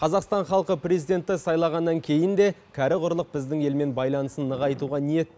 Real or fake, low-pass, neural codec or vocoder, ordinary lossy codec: real; none; none; none